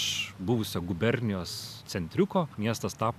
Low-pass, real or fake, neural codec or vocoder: 14.4 kHz; real; none